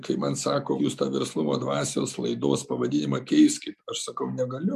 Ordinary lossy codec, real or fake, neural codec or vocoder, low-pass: MP3, 96 kbps; real; none; 14.4 kHz